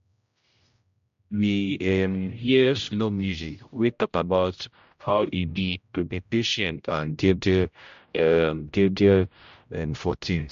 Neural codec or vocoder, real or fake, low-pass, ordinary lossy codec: codec, 16 kHz, 0.5 kbps, X-Codec, HuBERT features, trained on general audio; fake; 7.2 kHz; MP3, 48 kbps